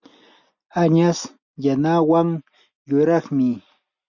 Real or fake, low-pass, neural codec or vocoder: real; 7.2 kHz; none